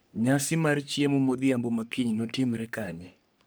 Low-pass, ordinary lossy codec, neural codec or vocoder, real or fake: none; none; codec, 44.1 kHz, 3.4 kbps, Pupu-Codec; fake